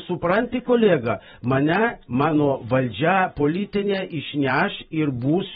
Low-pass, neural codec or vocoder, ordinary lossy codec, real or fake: 19.8 kHz; vocoder, 44.1 kHz, 128 mel bands every 512 samples, BigVGAN v2; AAC, 16 kbps; fake